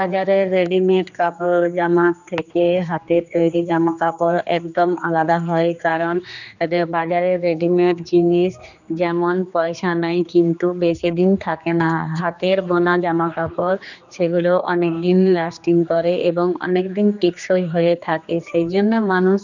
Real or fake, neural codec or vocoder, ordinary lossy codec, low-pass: fake; codec, 16 kHz, 2 kbps, X-Codec, HuBERT features, trained on general audio; none; 7.2 kHz